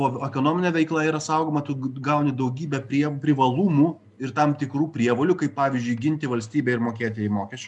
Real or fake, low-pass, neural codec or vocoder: real; 10.8 kHz; none